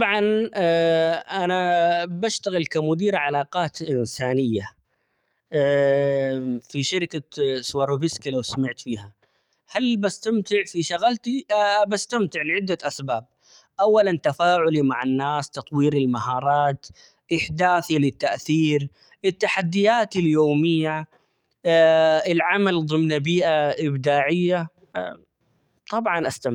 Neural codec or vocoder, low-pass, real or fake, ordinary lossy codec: codec, 44.1 kHz, 7.8 kbps, DAC; 19.8 kHz; fake; none